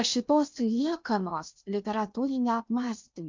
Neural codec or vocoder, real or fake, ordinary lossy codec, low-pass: codec, 16 kHz in and 24 kHz out, 0.8 kbps, FocalCodec, streaming, 65536 codes; fake; MP3, 64 kbps; 7.2 kHz